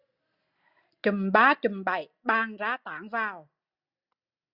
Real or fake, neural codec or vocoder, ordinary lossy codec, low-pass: real; none; Opus, 64 kbps; 5.4 kHz